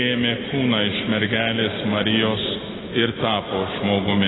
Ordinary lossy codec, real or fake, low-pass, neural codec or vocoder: AAC, 16 kbps; real; 7.2 kHz; none